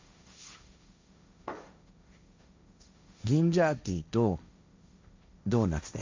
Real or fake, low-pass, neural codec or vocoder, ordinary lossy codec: fake; 7.2 kHz; codec, 16 kHz, 1.1 kbps, Voila-Tokenizer; MP3, 64 kbps